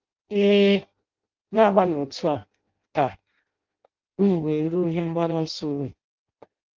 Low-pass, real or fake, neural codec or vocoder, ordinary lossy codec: 7.2 kHz; fake; codec, 16 kHz in and 24 kHz out, 0.6 kbps, FireRedTTS-2 codec; Opus, 32 kbps